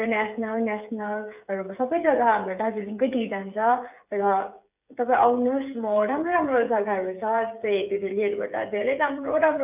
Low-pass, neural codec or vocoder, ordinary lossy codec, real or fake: 3.6 kHz; codec, 16 kHz, 8 kbps, FreqCodec, smaller model; none; fake